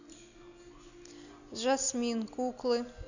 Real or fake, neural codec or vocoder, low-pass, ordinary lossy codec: real; none; 7.2 kHz; none